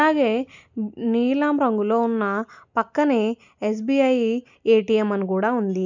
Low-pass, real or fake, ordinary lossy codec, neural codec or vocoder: 7.2 kHz; real; none; none